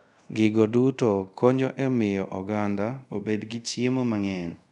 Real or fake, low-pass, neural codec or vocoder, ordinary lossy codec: fake; 10.8 kHz; codec, 24 kHz, 0.5 kbps, DualCodec; none